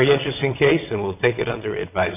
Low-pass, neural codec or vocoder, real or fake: 3.6 kHz; vocoder, 22.05 kHz, 80 mel bands, WaveNeXt; fake